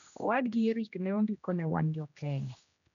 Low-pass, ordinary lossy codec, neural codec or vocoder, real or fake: 7.2 kHz; none; codec, 16 kHz, 1 kbps, X-Codec, HuBERT features, trained on general audio; fake